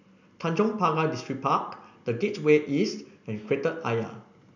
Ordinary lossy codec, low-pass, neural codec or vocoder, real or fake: none; 7.2 kHz; none; real